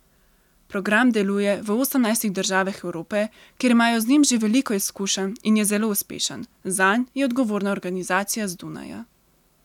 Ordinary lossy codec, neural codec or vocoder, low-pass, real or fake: none; none; 19.8 kHz; real